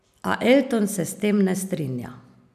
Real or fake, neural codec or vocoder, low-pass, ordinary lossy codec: real; none; 14.4 kHz; none